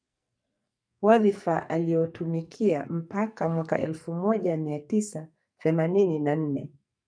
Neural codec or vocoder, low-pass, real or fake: codec, 44.1 kHz, 2.6 kbps, SNAC; 9.9 kHz; fake